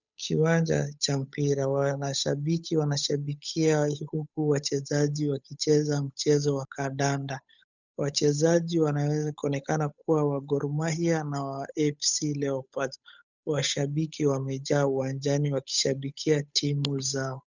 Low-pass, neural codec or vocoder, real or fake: 7.2 kHz; codec, 16 kHz, 8 kbps, FunCodec, trained on Chinese and English, 25 frames a second; fake